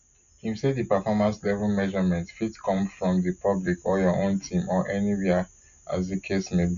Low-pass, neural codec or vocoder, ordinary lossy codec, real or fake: 7.2 kHz; none; none; real